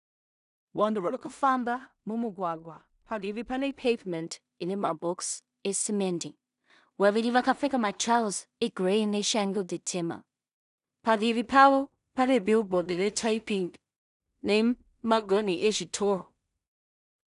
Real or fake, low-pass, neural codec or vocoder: fake; 10.8 kHz; codec, 16 kHz in and 24 kHz out, 0.4 kbps, LongCat-Audio-Codec, two codebook decoder